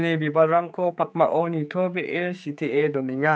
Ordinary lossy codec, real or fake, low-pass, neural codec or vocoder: none; fake; none; codec, 16 kHz, 4 kbps, X-Codec, HuBERT features, trained on general audio